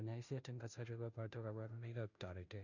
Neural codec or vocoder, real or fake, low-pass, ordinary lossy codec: codec, 16 kHz, 0.5 kbps, FunCodec, trained on Chinese and English, 25 frames a second; fake; 7.2 kHz; none